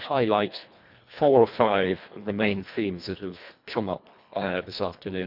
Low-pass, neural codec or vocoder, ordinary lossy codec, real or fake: 5.4 kHz; codec, 24 kHz, 1.5 kbps, HILCodec; none; fake